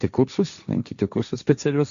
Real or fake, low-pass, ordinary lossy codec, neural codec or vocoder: fake; 7.2 kHz; AAC, 96 kbps; codec, 16 kHz, 1.1 kbps, Voila-Tokenizer